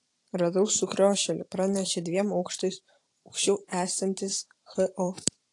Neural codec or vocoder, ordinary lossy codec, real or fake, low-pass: vocoder, 44.1 kHz, 128 mel bands every 512 samples, BigVGAN v2; AAC, 48 kbps; fake; 10.8 kHz